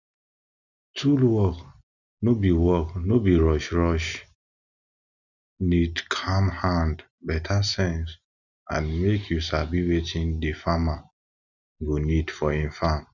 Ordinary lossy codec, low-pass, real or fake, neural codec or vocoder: none; 7.2 kHz; real; none